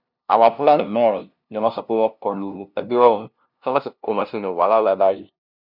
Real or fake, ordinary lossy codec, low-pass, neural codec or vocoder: fake; none; 5.4 kHz; codec, 16 kHz, 0.5 kbps, FunCodec, trained on LibriTTS, 25 frames a second